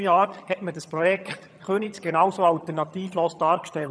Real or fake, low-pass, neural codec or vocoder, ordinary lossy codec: fake; none; vocoder, 22.05 kHz, 80 mel bands, HiFi-GAN; none